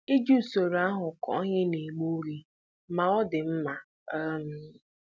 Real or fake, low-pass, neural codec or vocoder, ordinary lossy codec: real; none; none; none